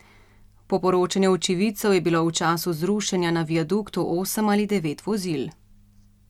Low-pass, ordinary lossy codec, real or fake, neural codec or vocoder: 19.8 kHz; MP3, 96 kbps; real; none